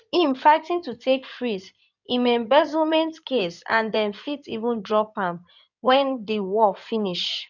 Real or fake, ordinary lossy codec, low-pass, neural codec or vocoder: fake; none; 7.2 kHz; codec, 16 kHz in and 24 kHz out, 2.2 kbps, FireRedTTS-2 codec